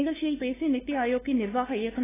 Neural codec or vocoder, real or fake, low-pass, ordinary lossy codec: codec, 16 kHz, 2 kbps, FunCodec, trained on LibriTTS, 25 frames a second; fake; 3.6 kHz; AAC, 16 kbps